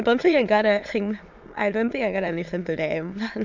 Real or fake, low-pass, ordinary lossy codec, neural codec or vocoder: fake; 7.2 kHz; MP3, 64 kbps; autoencoder, 22.05 kHz, a latent of 192 numbers a frame, VITS, trained on many speakers